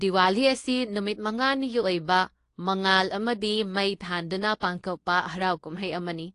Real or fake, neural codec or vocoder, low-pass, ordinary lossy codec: fake; codec, 24 kHz, 0.9 kbps, WavTokenizer, medium speech release version 2; 10.8 kHz; AAC, 48 kbps